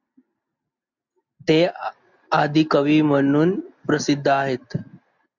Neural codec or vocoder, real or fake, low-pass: none; real; 7.2 kHz